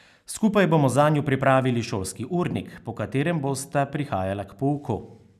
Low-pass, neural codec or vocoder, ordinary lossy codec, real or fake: 14.4 kHz; none; none; real